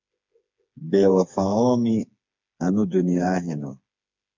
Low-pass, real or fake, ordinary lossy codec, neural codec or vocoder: 7.2 kHz; fake; MP3, 64 kbps; codec, 16 kHz, 4 kbps, FreqCodec, smaller model